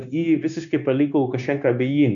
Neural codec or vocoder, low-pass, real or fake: codec, 16 kHz, 0.9 kbps, LongCat-Audio-Codec; 7.2 kHz; fake